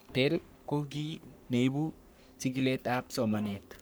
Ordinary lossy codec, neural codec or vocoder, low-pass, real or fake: none; codec, 44.1 kHz, 3.4 kbps, Pupu-Codec; none; fake